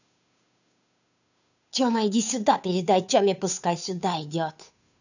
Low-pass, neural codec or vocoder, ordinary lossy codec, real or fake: 7.2 kHz; codec, 16 kHz, 2 kbps, FunCodec, trained on Chinese and English, 25 frames a second; none; fake